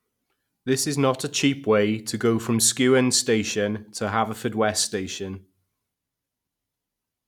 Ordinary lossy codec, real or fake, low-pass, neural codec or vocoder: none; real; 19.8 kHz; none